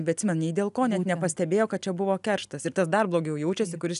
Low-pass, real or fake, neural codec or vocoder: 10.8 kHz; real; none